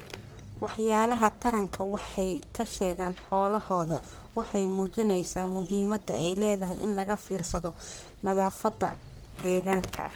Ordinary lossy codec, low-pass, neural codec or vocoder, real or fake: none; none; codec, 44.1 kHz, 1.7 kbps, Pupu-Codec; fake